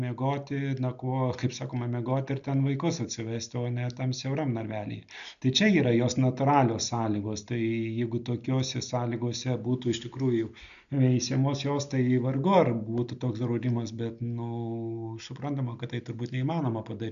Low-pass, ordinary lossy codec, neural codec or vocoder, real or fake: 7.2 kHz; MP3, 96 kbps; none; real